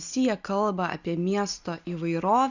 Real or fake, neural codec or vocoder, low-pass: real; none; 7.2 kHz